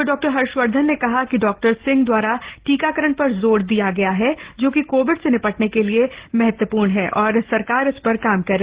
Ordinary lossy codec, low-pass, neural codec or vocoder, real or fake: Opus, 16 kbps; 3.6 kHz; none; real